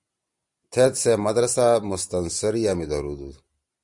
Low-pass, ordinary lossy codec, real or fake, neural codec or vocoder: 10.8 kHz; Opus, 64 kbps; real; none